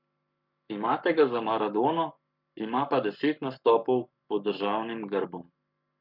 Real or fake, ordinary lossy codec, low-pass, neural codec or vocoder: fake; none; 5.4 kHz; codec, 44.1 kHz, 7.8 kbps, Pupu-Codec